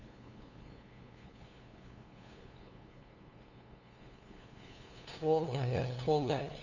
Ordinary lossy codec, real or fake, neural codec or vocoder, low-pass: none; fake; codec, 16 kHz, 2 kbps, FunCodec, trained on LibriTTS, 25 frames a second; 7.2 kHz